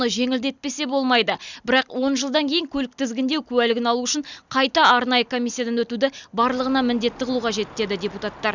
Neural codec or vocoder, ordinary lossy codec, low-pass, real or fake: none; none; 7.2 kHz; real